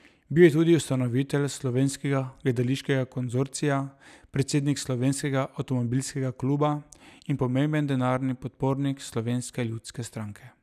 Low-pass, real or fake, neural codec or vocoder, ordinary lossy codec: 14.4 kHz; real; none; none